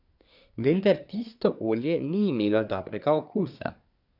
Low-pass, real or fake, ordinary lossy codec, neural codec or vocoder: 5.4 kHz; fake; none; codec, 24 kHz, 1 kbps, SNAC